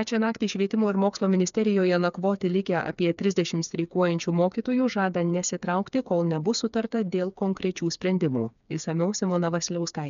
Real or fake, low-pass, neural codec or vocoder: fake; 7.2 kHz; codec, 16 kHz, 4 kbps, FreqCodec, smaller model